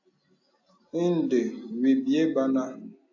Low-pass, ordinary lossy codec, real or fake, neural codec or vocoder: 7.2 kHz; AAC, 48 kbps; real; none